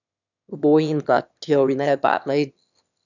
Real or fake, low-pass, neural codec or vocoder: fake; 7.2 kHz; autoencoder, 22.05 kHz, a latent of 192 numbers a frame, VITS, trained on one speaker